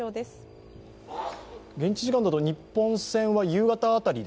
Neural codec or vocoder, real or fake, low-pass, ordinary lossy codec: none; real; none; none